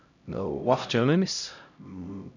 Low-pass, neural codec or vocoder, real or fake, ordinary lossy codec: 7.2 kHz; codec, 16 kHz, 0.5 kbps, X-Codec, HuBERT features, trained on LibriSpeech; fake; none